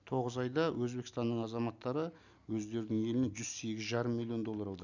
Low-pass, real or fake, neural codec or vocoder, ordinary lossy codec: 7.2 kHz; real; none; none